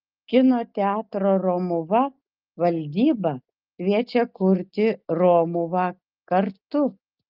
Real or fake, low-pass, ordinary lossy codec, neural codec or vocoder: real; 5.4 kHz; Opus, 24 kbps; none